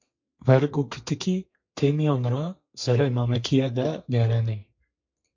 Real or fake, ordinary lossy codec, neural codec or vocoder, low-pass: fake; MP3, 48 kbps; codec, 32 kHz, 1.9 kbps, SNAC; 7.2 kHz